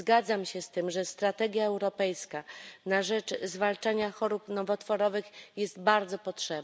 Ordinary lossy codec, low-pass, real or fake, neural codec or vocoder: none; none; real; none